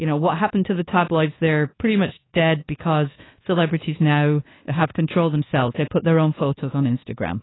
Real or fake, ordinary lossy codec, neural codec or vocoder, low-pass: fake; AAC, 16 kbps; codec, 24 kHz, 1.2 kbps, DualCodec; 7.2 kHz